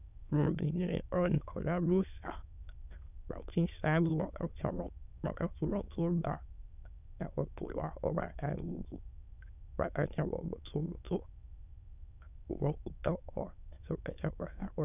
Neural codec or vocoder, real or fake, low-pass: autoencoder, 22.05 kHz, a latent of 192 numbers a frame, VITS, trained on many speakers; fake; 3.6 kHz